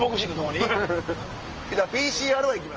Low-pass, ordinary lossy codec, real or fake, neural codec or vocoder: 7.2 kHz; Opus, 24 kbps; real; none